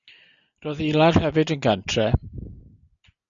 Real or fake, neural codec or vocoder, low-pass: real; none; 7.2 kHz